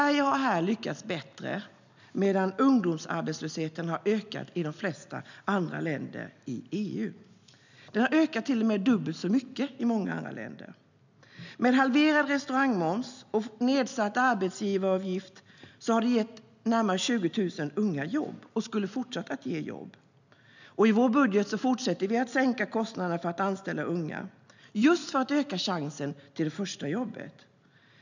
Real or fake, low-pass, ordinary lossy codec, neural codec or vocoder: real; 7.2 kHz; none; none